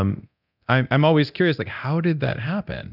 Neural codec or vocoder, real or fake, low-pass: codec, 24 kHz, 0.9 kbps, DualCodec; fake; 5.4 kHz